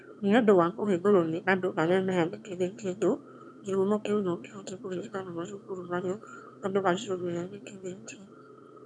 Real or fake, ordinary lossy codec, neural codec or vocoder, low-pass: fake; none; autoencoder, 22.05 kHz, a latent of 192 numbers a frame, VITS, trained on one speaker; none